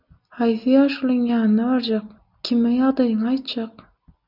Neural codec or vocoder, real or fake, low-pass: none; real; 5.4 kHz